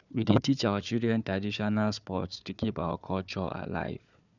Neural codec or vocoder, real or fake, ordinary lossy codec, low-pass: codec, 16 kHz, 4 kbps, FunCodec, trained on Chinese and English, 50 frames a second; fake; none; 7.2 kHz